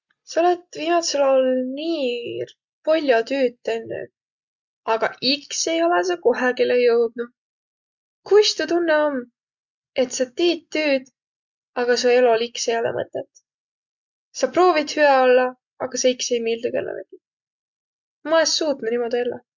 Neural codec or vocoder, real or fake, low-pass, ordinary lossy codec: none; real; 7.2 kHz; Opus, 64 kbps